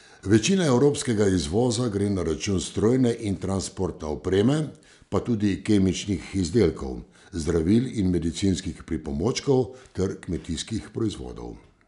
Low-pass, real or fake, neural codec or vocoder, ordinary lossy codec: 10.8 kHz; real; none; none